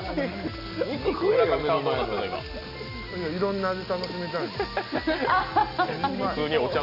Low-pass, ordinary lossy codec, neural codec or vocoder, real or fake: 5.4 kHz; none; none; real